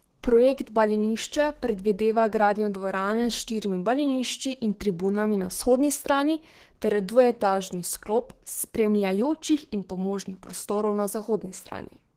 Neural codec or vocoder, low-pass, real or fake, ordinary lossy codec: codec, 32 kHz, 1.9 kbps, SNAC; 14.4 kHz; fake; Opus, 16 kbps